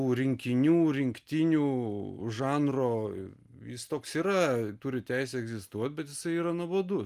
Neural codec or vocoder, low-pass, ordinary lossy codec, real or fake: none; 14.4 kHz; Opus, 32 kbps; real